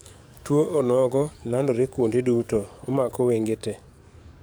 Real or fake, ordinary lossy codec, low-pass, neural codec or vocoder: fake; none; none; codec, 44.1 kHz, 7.8 kbps, DAC